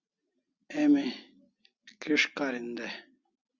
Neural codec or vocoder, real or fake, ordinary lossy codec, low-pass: none; real; Opus, 64 kbps; 7.2 kHz